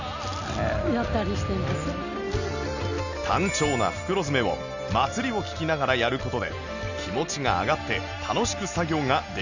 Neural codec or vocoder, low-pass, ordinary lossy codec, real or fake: none; 7.2 kHz; none; real